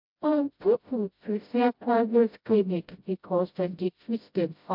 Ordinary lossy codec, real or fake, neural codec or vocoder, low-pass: MP3, 48 kbps; fake; codec, 16 kHz, 0.5 kbps, FreqCodec, smaller model; 5.4 kHz